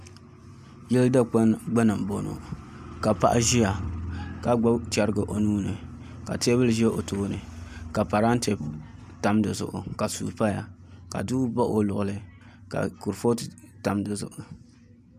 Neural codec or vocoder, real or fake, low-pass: none; real; 14.4 kHz